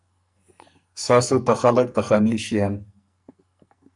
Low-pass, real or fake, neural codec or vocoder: 10.8 kHz; fake; codec, 44.1 kHz, 2.6 kbps, SNAC